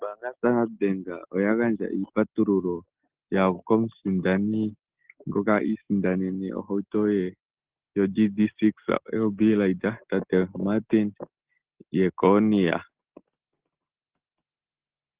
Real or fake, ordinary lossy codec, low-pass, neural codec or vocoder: real; Opus, 16 kbps; 3.6 kHz; none